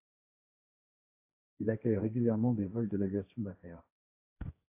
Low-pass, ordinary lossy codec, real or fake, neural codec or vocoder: 3.6 kHz; AAC, 24 kbps; fake; codec, 16 kHz, 1.1 kbps, Voila-Tokenizer